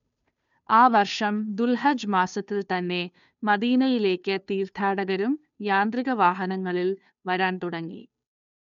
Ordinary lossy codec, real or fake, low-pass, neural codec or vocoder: none; fake; 7.2 kHz; codec, 16 kHz, 2 kbps, FunCodec, trained on Chinese and English, 25 frames a second